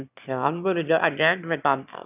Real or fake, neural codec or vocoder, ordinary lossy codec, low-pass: fake; autoencoder, 22.05 kHz, a latent of 192 numbers a frame, VITS, trained on one speaker; none; 3.6 kHz